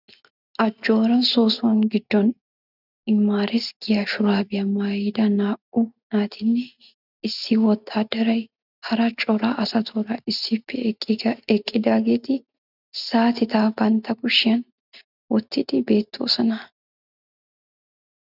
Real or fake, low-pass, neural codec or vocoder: real; 5.4 kHz; none